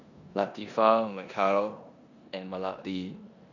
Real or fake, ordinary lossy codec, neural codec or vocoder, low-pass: fake; none; codec, 16 kHz in and 24 kHz out, 0.9 kbps, LongCat-Audio-Codec, four codebook decoder; 7.2 kHz